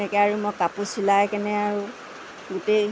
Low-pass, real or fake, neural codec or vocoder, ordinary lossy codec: none; real; none; none